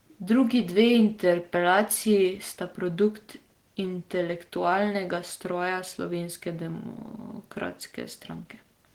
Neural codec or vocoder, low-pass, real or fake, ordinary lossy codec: none; 19.8 kHz; real; Opus, 16 kbps